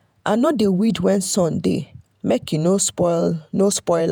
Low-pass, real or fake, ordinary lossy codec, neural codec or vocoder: none; fake; none; vocoder, 48 kHz, 128 mel bands, Vocos